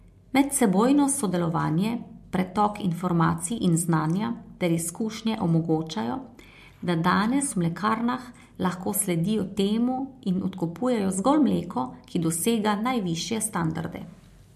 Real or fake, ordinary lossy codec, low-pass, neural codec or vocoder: real; MP3, 64 kbps; 14.4 kHz; none